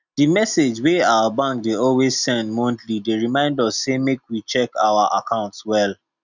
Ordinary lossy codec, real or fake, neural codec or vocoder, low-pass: none; real; none; 7.2 kHz